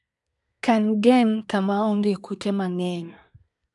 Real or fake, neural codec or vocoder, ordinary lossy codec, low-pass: fake; codec, 24 kHz, 1 kbps, SNAC; none; 10.8 kHz